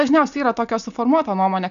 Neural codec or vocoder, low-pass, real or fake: none; 7.2 kHz; real